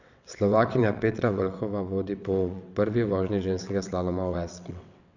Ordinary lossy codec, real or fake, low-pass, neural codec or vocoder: none; fake; 7.2 kHz; vocoder, 22.05 kHz, 80 mel bands, WaveNeXt